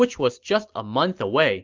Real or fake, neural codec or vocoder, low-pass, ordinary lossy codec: real; none; 7.2 kHz; Opus, 32 kbps